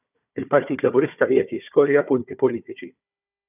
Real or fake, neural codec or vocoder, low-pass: fake; codec, 16 kHz, 4 kbps, FunCodec, trained on Chinese and English, 50 frames a second; 3.6 kHz